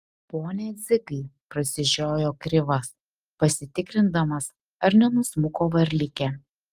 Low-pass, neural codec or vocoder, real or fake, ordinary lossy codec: 14.4 kHz; none; real; Opus, 32 kbps